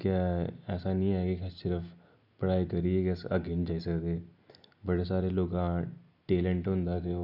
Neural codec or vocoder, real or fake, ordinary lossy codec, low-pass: none; real; none; 5.4 kHz